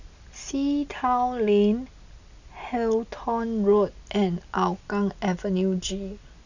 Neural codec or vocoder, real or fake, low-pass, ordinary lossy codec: none; real; 7.2 kHz; none